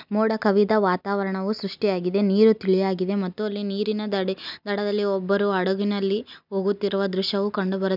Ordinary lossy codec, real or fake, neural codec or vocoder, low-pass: none; real; none; 5.4 kHz